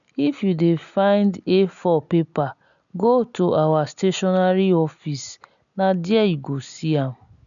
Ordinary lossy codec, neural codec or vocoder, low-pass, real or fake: none; none; 7.2 kHz; real